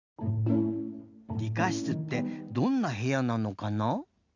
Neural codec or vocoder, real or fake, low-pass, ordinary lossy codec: none; real; 7.2 kHz; none